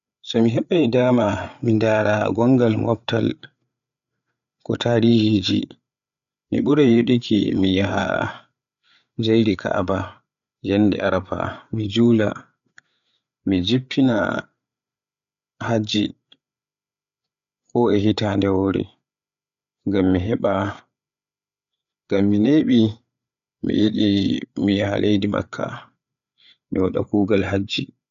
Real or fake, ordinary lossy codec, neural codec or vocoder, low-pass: fake; none; codec, 16 kHz, 8 kbps, FreqCodec, larger model; 7.2 kHz